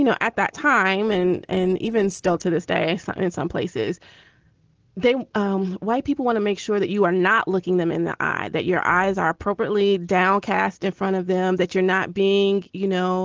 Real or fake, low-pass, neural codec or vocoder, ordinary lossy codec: real; 7.2 kHz; none; Opus, 16 kbps